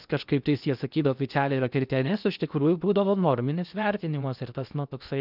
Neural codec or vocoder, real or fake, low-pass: codec, 16 kHz in and 24 kHz out, 0.8 kbps, FocalCodec, streaming, 65536 codes; fake; 5.4 kHz